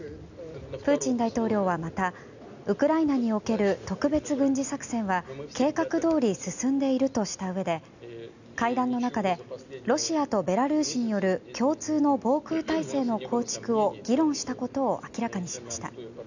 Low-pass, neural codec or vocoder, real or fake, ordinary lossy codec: 7.2 kHz; none; real; none